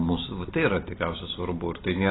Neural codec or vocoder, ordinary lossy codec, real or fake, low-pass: none; AAC, 16 kbps; real; 7.2 kHz